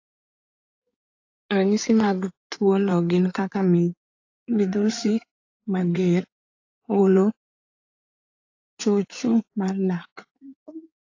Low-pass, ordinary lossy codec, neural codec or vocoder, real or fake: 7.2 kHz; AAC, 48 kbps; codec, 16 kHz in and 24 kHz out, 2.2 kbps, FireRedTTS-2 codec; fake